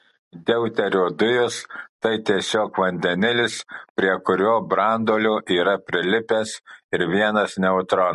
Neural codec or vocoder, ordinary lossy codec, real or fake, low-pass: none; MP3, 48 kbps; real; 14.4 kHz